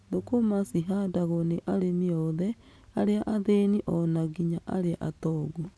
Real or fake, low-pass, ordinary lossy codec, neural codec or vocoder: real; none; none; none